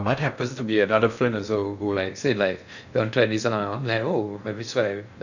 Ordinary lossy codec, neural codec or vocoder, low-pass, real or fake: none; codec, 16 kHz in and 24 kHz out, 0.6 kbps, FocalCodec, streaming, 2048 codes; 7.2 kHz; fake